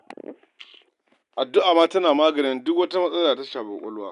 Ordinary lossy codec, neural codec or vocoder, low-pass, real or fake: none; none; 10.8 kHz; real